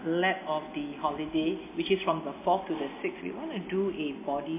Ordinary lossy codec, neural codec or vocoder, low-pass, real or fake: MP3, 24 kbps; none; 3.6 kHz; real